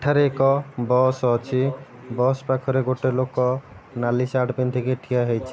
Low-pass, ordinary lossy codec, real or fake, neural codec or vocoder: none; none; real; none